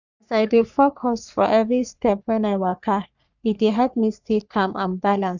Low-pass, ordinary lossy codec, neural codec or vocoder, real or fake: 7.2 kHz; none; codec, 44.1 kHz, 3.4 kbps, Pupu-Codec; fake